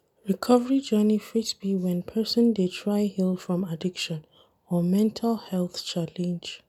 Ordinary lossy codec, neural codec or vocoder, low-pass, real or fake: none; none; 19.8 kHz; real